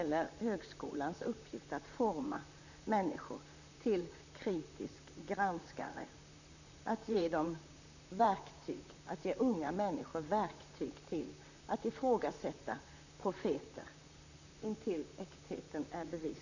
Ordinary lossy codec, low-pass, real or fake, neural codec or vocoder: none; 7.2 kHz; fake; vocoder, 44.1 kHz, 128 mel bands, Pupu-Vocoder